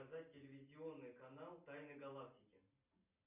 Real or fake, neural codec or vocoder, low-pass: real; none; 3.6 kHz